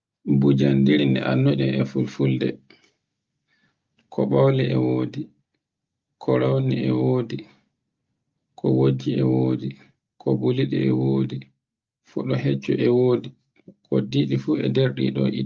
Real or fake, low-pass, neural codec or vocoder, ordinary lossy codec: real; 7.2 kHz; none; Opus, 24 kbps